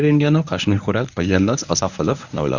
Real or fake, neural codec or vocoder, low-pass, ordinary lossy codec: fake; codec, 24 kHz, 0.9 kbps, WavTokenizer, medium speech release version 1; 7.2 kHz; none